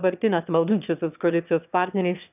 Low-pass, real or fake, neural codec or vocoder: 3.6 kHz; fake; autoencoder, 22.05 kHz, a latent of 192 numbers a frame, VITS, trained on one speaker